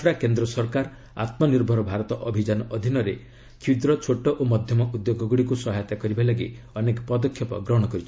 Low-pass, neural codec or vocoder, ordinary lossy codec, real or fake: none; none; none; real